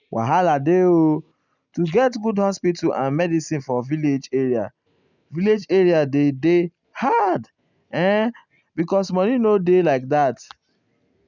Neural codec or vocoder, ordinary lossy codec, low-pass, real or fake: none; none; 7.2 kHz; real